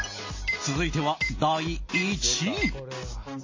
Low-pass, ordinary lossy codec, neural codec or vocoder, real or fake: 7.2 kHz; MP3, 32 kbps; none; real